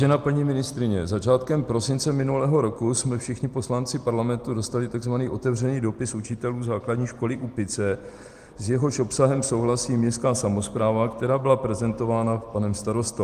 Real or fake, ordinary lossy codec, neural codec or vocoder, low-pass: real; Opus, 24 kbps; none; 14.4 kHz